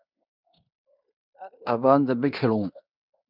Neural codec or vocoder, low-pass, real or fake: codec, 16 kHz in and 24 kHz out, 0.9 kbps, LongCat-Audio-Codec, fine tuned four codebook decoder; 5.4 kHz; fake